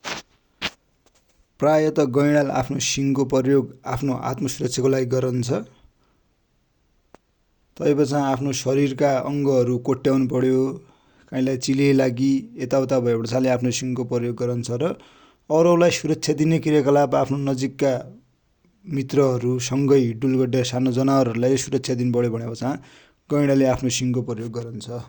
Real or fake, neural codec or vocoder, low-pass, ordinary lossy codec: real; none; 19.8 kHz; Opus, 64 kbps